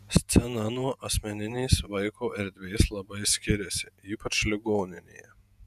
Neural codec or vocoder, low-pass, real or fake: vocoder, 48 kHz, 128 mel bands, Vocos; 14.4 kHz; fake